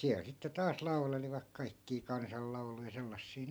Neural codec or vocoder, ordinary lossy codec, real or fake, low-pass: none; none; real; none